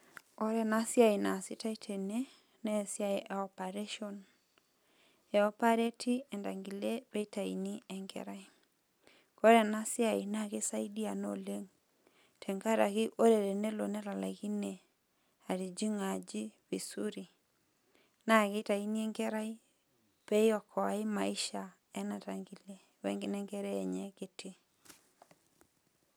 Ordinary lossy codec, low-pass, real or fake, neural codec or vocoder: none; none; real; none